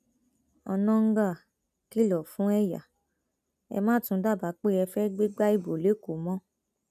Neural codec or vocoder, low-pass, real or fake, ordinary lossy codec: none; 14.4 kHz; real; none